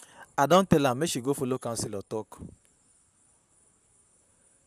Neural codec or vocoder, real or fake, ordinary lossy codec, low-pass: vocoder, 44.1 kHz, 128 mel bands, Pupu-Vocoder; fake; none; 14.4 kHz